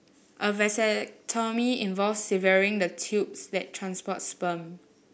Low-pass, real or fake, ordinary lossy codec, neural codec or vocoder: none; real; none; none